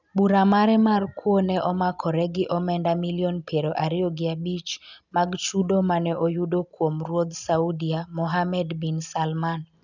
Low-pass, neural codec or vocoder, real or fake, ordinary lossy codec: 7.2 kHz; none; real; none